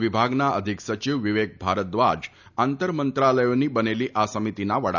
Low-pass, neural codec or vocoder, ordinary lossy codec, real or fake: 7.2 kHz; none; none; real